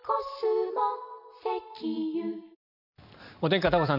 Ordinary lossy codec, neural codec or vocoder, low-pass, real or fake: none; none; 5.4 kHz; real